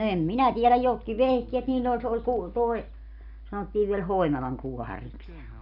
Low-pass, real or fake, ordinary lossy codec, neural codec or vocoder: 5.4 kHz; real; none; none